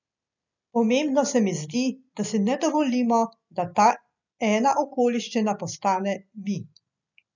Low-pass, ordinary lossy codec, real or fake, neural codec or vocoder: 7.2 kHz; none; real; none